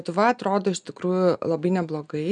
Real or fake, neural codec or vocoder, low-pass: real; none; 9.9 kHz